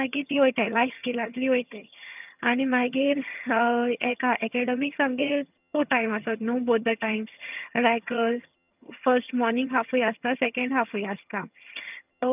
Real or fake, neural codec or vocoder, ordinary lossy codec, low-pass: fake; vocoder, 22.05 kHz, 80 mel bands, HiFi-GAN; none; 3.6 kHz